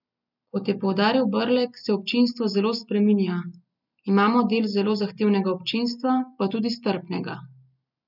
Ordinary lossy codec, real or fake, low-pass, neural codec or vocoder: none; real; 5.4 kHz; none